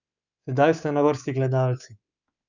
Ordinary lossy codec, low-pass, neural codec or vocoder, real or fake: none; 7.2 kHz; codec, 24 kHz, 3.1 kbps, DualCodec; fake